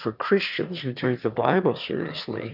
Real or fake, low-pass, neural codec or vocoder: fake; 5.4 kHz; autoencoder, 22.05 kHz, a latent of 192 numbers a frame, VITS, trained on one speaker